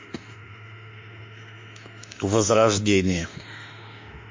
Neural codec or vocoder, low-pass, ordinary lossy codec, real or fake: autoencoder, 48 kHz, 32 numbers a frame, DAC-VAE, trained on Japanese speech; 7.2 kHz; MP3, 48 kbps; fake